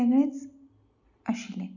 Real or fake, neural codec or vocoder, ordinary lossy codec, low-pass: real; none; none; 7.2 kHz